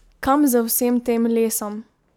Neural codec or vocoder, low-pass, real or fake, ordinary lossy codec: none; none; real; none